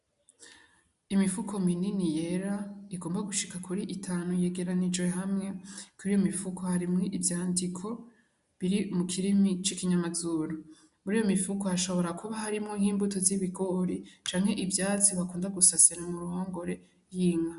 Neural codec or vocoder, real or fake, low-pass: none; real; 10.8 kHz